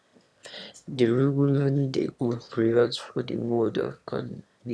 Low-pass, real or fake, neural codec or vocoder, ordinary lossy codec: none; fake; autoencoder, 22.05 kHz, a latent of 192 numbers a frame, VITS, trained on one speaker; none